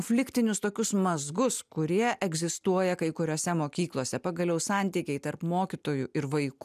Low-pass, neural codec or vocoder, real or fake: 14.4 kHz; none; real